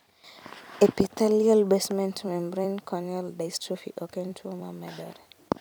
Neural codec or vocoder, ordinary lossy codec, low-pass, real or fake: vocoder, 44.1 kHz, 128 mel bands every 256 samples, BigVGAN v2; none; none; fake